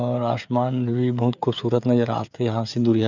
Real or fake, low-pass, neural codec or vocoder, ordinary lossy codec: fake; 7.2 kHz; codec, 16 kHz, 16 kbps, FreqCodec, smaller model; none